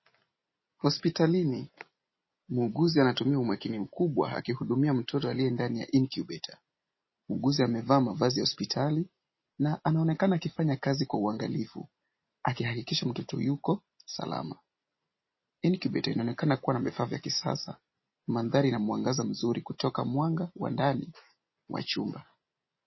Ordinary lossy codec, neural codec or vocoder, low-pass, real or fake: MP3, 24 kbps; none; 7.2 kHz; real